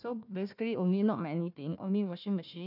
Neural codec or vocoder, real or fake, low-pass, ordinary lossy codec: codec, 16 kHz, 1 kbps, FunCodec, trained on Chinese and English, 50 frames a second; fake; 5.4 kHz; none